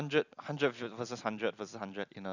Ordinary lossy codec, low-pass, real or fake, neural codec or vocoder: none; 7.2 kHz; real; none